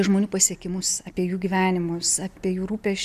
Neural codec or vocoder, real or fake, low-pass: none; real; 14.4 kHz